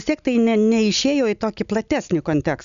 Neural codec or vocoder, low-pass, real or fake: none; 7.2 kHz; real